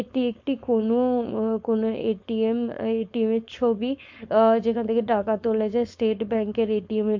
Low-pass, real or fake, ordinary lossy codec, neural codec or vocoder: 7.2 kHz; fake; MP3, 48 kbps; codec, 16 kHz, 4.8 kbps, FACodec